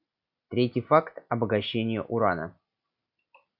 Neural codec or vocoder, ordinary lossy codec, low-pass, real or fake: none; Opus, 64 kbps; 5.4 kHz; real